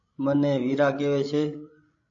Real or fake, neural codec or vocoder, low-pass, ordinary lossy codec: fake; codec, 16 kHz, 16 kbps, FreqCodec, larger model; 7.2 kHz; AAC, 48 kbps